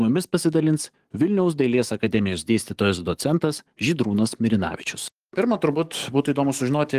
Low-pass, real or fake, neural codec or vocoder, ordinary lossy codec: 14.4 kHz; fake; codec, 44.1 kHz, 7.8 kbps, DAC; Opus, 24 kbps